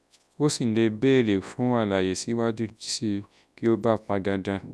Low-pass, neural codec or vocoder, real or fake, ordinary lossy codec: none; codec, 24 kHz, 0.9 kbps, WavTokenizer, large speech release; fake; none